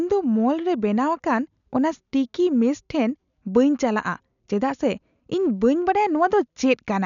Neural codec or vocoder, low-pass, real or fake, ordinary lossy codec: none; 7.2 kHz; real; none